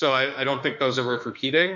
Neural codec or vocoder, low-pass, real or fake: autoencoder, 48 kHz, 32 numbers a frame, DAC-VAE, trained on Japanese speech; 7.2 kHz; fake